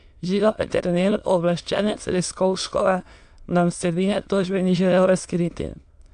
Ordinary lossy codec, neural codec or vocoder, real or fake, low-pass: AAC, 64 kbps; autoencoder, 22.05 kHz, a latent of 192 numbers a frame, VITS, trained on many speakers; fake; 9.9 kHz